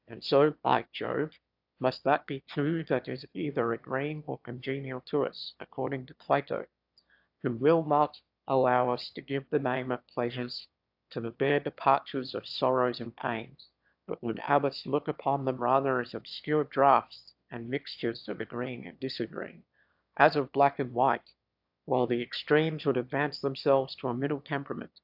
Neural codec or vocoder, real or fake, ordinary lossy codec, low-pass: autoencoder, 22.05 kHz, a latent of 192 numbers a frame, VITS, trained on one speaker; fake; AAC, 48 kbps; 5.4 kHz